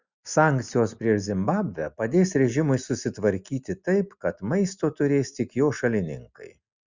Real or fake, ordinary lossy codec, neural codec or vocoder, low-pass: real; Opus, 64 kbps; none; 7.2 kHz